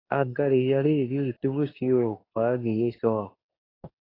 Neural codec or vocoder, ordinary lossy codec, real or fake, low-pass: codec, 24 kHz, 0.9 kbps, WavTokenizer, medium speech release version 2; AAC, 24 kbps; fake; 5.4 kHz